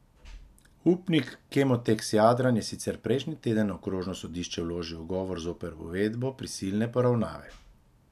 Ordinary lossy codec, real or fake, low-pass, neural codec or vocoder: none; real; 14.4 kHz; none